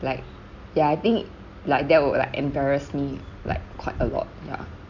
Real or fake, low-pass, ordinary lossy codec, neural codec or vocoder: real; 7.2 kHz; none; none